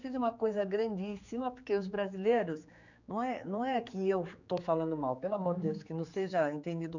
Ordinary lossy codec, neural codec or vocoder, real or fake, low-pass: none; codec, 16 kHz, 4 kbps, X-Codec, HuBERT features, trained on general audio; fake; 7.2 kHz